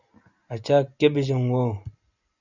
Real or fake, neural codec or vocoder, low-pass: real; none; 7.2 kHz